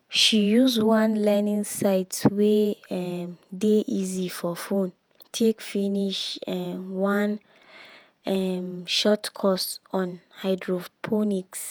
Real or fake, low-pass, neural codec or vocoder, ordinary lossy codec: fake; none; vocoder, 48 kHz, 128 mel bands, Vocos; none